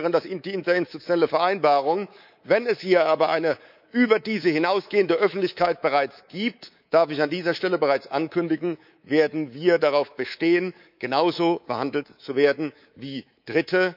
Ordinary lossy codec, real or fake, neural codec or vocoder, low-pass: none; fake; codec, 24 kHz, 3.1 kbps, DualCodec; 5.4 kHz